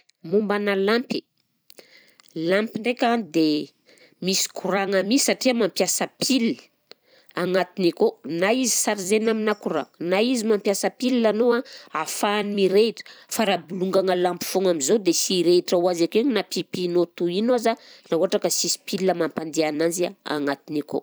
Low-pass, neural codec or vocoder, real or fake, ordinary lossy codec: none; vocoder, 44.1 kHz, 128 mel bands every 256 samples, BigVGAN v2; fake; none